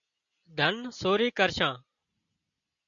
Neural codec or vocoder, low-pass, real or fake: none; 7.2 kHz; real